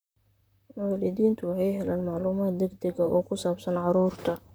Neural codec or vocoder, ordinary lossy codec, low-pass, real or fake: vocoder, 44.1 kHz, 128 mel bands, Pupu-Vocoder; none; none; fake